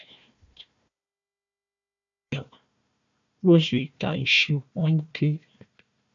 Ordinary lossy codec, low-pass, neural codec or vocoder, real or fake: AAC, 64 kbps; 7.2 kHz; codec, 16 kHz, 1 kbps, FunCodec, trained on Chinese and English, 50 frames a second; fake